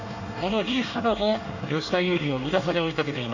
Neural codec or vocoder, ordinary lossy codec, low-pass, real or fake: codec, 24 kHz, 1 kbps, SNAC; none; 7.2 kHz; fake